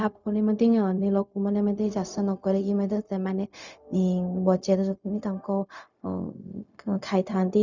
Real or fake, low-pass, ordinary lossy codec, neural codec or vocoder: fake; 7.2 kHz; none; codec, 16 kHz, 0.4 kbps, LongCat-Audio-Codec